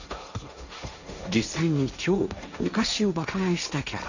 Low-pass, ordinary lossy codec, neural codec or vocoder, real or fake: 7.2 kHz; none; codec, 16 kHz, 1.1 kbps, Voila-Tokenizer; fake